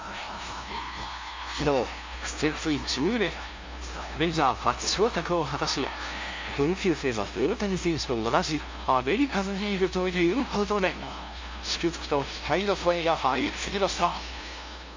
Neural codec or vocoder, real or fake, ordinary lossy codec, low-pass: codec, 16 kHz, 0.5 kbps, FunCodec, trained on LibriTTS, 25 frames a second; fake; MP3, 48 kbps; 7.2 kHz